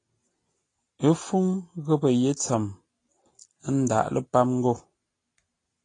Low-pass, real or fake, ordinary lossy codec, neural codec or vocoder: 9.9 kHz; real; AAC, 32 kbps; none